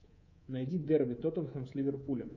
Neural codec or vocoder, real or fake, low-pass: codec, 24 kHz, 3.1 kbps, DualCodec; fake; 7.2 kHz